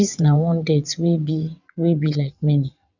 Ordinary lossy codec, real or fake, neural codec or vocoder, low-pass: none; fake; vocoder, 22.05 kHz, 80 mel bands, WaveNeXt; 7.2 kHz